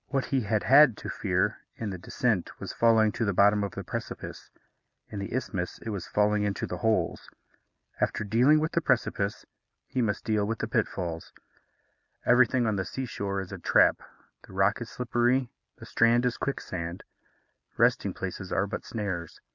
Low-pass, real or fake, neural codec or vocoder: 7.2 kHz; real; none